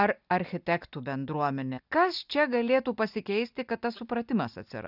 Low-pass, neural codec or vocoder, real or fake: 5.4 kHz; none; real